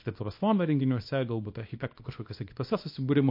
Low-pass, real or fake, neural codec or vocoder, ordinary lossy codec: 5.4 kHz; fake; codec, 24 kHz, 0.9 kbps, WavTokenizer, small release; MP3, 32 kbps